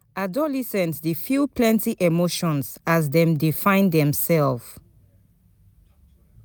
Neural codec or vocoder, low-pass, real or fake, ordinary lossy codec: none; none; real; none